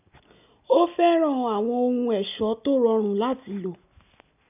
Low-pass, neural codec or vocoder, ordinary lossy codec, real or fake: 3.6 kHz; none; none; real